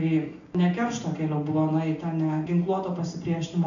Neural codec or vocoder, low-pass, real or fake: none; 7.2 kHz; real